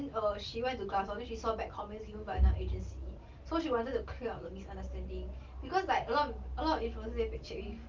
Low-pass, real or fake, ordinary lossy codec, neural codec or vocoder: 7.2 kHz; real; Opus, 32 kbps; none